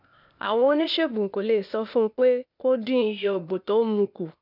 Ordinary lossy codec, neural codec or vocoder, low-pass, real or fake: none; codec, 16 kHz, 0.8 kbps, ZipCodec; 5.4 kHz; fake